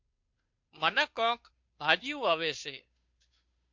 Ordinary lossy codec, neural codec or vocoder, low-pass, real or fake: MP3, 48 kbps; codec, 16 kHz, 2 kbps, FunCodec, trained on Chinese and English, 25 frames a second; 7.2 kHz; fake